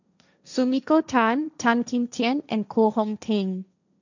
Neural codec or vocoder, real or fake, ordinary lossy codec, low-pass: codec, 16 kHz, 1.1 kbps, Voila-Tokenizer; fake; none; 7.2 kHz